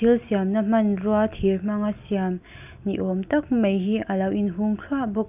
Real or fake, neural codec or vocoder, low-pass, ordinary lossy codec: real; none; 3.6 kHz; none